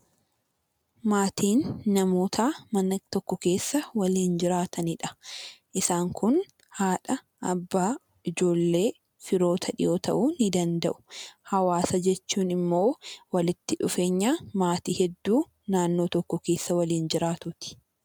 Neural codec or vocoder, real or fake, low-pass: none; real; 19.8 kHz